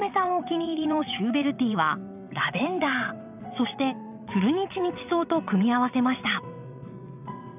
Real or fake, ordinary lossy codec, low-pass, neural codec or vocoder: fake; none; 3.6 kHz; vocoder, 22.05 kHz, 80 mel bands, WaveNeXt